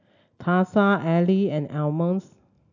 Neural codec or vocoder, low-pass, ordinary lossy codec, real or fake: none; 7.2 kHz; none; real